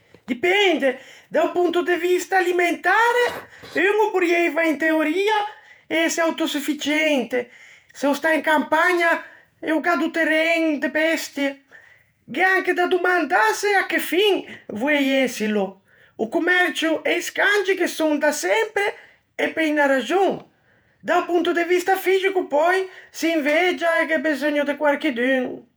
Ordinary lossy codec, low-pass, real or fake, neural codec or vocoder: none; none; fake; vocoder, 48 kHz, 128 mel bands, Vocos